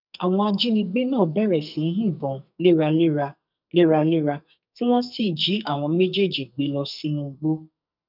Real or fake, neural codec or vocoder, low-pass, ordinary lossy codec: fake; codec, 44.1 kHz, 2.6 kbps, SNAC; 5.4 kHz; none